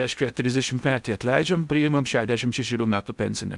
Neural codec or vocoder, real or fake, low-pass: codec, 16 kHz in and 24 kHz out, 0.8 kbps, FocalCodec, streaming, 65536 codes; fake; 10.8 kHz